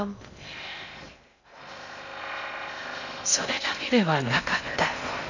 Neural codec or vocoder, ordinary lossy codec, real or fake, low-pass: codec, 16 kHz in and 24 kHz out, 0.6 kbps, FocalCodec, streaming, 2048 codes; none; fake; 7.2 kHz